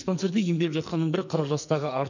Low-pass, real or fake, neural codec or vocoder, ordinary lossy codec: 7.2 kHz; fake; codec, 44.1 kHz, 2.6 kbps, SNAC; none